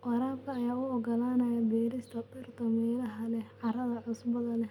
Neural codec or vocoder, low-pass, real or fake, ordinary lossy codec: none; 19.8 kHz; real; none